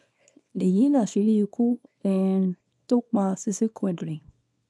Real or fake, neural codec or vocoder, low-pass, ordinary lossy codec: fake; codec, 24 kHz, 0.9 kbps, WavTokenizer, small release; none; none